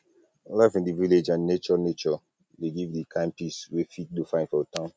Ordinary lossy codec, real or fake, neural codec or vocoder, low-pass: none; real; none; none